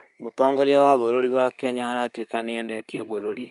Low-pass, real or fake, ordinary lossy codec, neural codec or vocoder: 10.8 kHz; fake; none; codec, 24 kHz, 1 kbps, SNAC